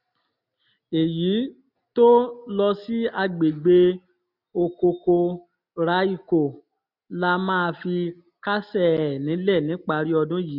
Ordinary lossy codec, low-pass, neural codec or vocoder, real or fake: none; 5.4 kHz; none; real